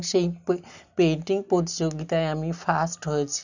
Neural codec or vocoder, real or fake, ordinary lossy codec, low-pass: none; real; none; 7.2 kHz